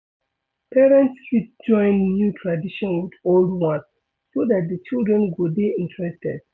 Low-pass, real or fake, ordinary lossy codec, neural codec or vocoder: none; real; none; none